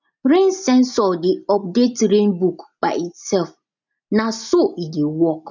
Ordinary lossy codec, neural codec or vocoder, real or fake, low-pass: none; none; real; 7.2 kHz